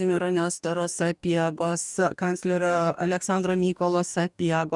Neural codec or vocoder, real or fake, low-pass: codec, 44.1 kHz, 2.6 kbps, DAC; fake; 10.8 kHz